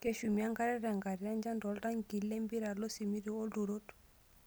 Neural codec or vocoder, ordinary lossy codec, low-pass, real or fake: none; none; none; real